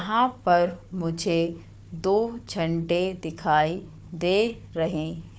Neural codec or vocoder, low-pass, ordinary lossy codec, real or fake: codec, 16 kHz, 4 kbps, FunCodec, trained on Chinese and English, 50 frames a second; none; none; fake